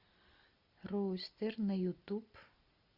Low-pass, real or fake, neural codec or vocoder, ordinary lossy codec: 5.4 kHz; real; none; Opus, 64 kbps